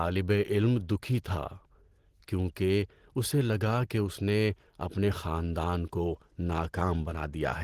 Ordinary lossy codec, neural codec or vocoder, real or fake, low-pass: Opus, 16 kbps; none; real; 14.4 kHz